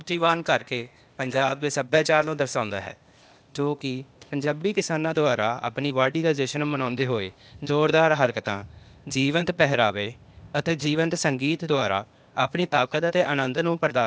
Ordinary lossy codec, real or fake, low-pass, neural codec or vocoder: none; fake; none; codec, 16 kHz, 0.8 kbps, ZipCodec